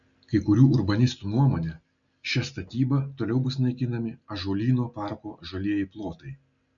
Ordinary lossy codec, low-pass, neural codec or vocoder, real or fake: MP3, 96 kbps; 7.2 kHz; none; real